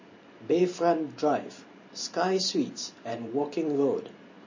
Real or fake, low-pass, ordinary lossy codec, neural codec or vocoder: fake; 7.2 kHz; MP3, 32 kbps; vocoder, 44.1 kHz, 128 mel bands every 512 samples, BigVGAN v2